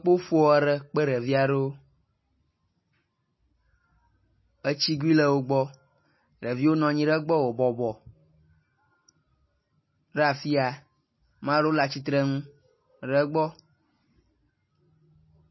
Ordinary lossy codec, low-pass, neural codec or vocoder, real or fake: MP3, 24 kbps; 7.2 kHz; none; real